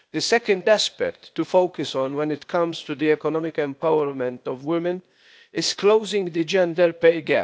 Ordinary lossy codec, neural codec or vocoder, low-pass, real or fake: none; codec, 16 kHz, 0.8 kbps, ZipCodec; none; fake